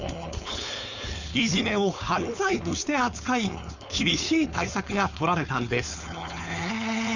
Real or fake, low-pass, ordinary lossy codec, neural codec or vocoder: fake; 7.2 kHz; none; codec, 16 kHz, 4.8 kbps, FACodec